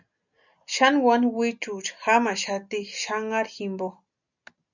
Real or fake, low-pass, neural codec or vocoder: real; 7.2 kHz; none